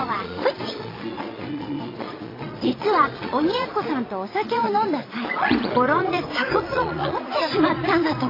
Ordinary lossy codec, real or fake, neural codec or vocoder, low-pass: AAC, 24 kbps; fake; vocoder, 22.05 kHz, 80 mel bands, Vocos; 5.4 kHz